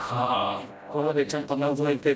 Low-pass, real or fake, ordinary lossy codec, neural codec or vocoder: none; fake; none; codec, 16 kHz, 0.5 kbps, FreqCodec, smaller model